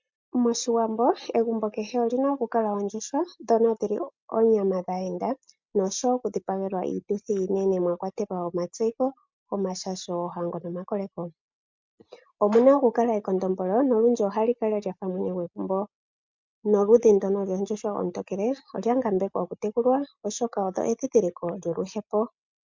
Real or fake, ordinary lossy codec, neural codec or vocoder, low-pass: real; MP3, 64 kbps; none; 7.2 kHz